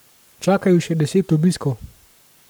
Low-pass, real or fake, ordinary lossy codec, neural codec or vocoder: none; fake; none; codec, 44.1 kHz, 7.8 kbps, Pupu-Codec